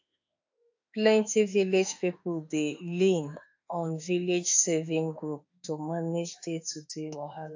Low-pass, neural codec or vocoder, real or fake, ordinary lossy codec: 7.2 kHz; autoencoder, 48 kHz, 32 numbers a frame, DAC-VAE, trained on Japanese speech; fake; AAC, 48 kbps